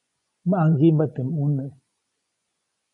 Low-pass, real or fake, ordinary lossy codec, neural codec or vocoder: 10.8 kHz; fake; MP3, 96 kbps; vocoder, 24 kHz, 100 mel bands, Vocos